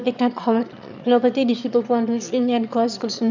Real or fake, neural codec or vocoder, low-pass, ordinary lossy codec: fake; autoencoder, 22.05 kHz, a latent of 192 numbers a frame, VITS, trained on one speaker; 7.2 kHz; none